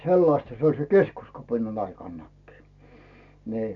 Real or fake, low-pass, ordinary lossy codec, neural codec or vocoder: real; 7.2 kHz; none; none